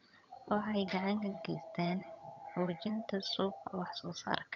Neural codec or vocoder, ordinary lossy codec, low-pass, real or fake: vocoder, 22.05 kHz, 80 mel bands, HiFi-GAN; none; 7.2 kHz; fake